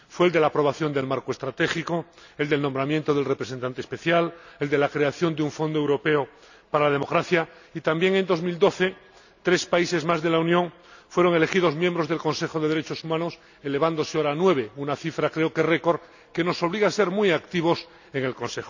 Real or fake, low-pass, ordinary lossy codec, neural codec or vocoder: real; 7.2 kHz; none; none